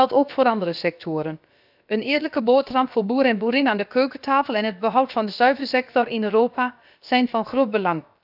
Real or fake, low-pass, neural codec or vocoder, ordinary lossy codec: fake; 5.4 kHz; codec, 16 kHz, about 1 kbps, DyCAST, with the encoder's durations; none